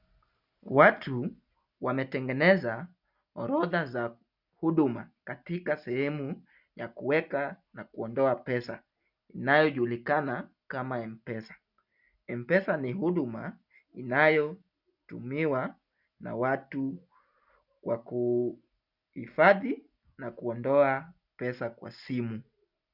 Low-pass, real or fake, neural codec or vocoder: 5.4 kHz; real; none